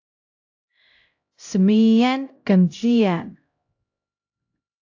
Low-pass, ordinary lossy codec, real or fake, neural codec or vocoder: 7.2 kHz; AAC, 48 kbps; fake; codec, 16 kHz, 0.5 kbps, X-Codec, HuBERT features, trained on LibriSpeech